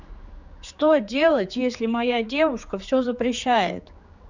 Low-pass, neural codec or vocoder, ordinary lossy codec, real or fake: 7.2 kHz; codec, 16 kHz, 4 kbps, X-Codec, HuBERT features, trained on general audio; Opus, 64 kbps; fake